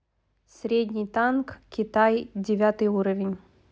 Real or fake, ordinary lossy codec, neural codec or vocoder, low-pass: real; none; none; none